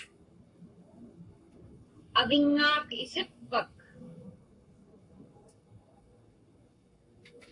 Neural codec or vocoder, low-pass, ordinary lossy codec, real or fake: codec, 44.1 kHz, 7.8 kbps, Pupu-Codec; 10.8 kHz; AAC, 64 kbps; fake